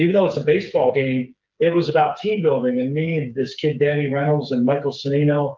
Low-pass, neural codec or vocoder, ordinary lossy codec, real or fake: 7.2 kHz; codec, 16 kHz, 4 kbps, FreqCodec, smaller model; Opus, 24 kbps; fake